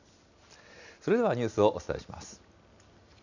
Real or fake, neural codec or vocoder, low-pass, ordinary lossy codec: real; none; 7.2 kHz; none